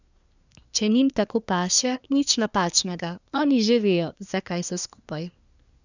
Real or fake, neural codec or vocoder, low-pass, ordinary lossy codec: fake; codec, 24 kHz, 1 kbps, SNAC; 7.2 kHz; none